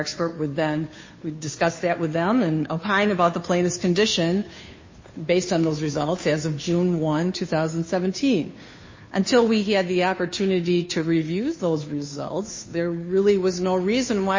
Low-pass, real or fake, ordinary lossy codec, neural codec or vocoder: 7.2 kHz; fake; MP3, 32 kbps; codec, 16 kHz, 2 kbps, FunCodec, trained on Chinese and English, 25 frames a second